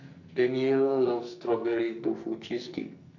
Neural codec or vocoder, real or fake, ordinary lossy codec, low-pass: codec, 44.1 kHz, 2.6 kbps, SNAC; fake; none; 7.2 kHz